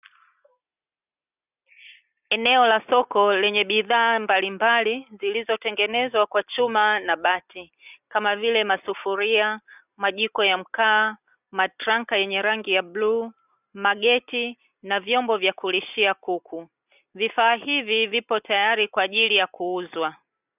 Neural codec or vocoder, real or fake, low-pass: none; real; 3.6 kHz